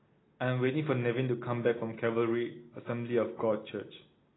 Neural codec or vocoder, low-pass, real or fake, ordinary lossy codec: none; 7.2 kHz; real; AAC, 16 kbps